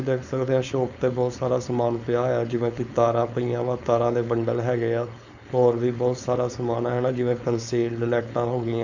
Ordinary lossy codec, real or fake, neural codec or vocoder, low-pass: none; fake; codec, 16 kHz, 4.8 kbps, FACodec; 7.2 kHz